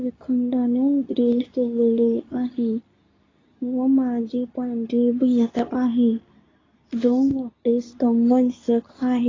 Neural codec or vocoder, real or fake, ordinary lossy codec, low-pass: codec, 24 kHz, 0.9 kbps, WavTokenizer, medium speech release version 2; fake; AAC, 32 kbps; 7.2 kHz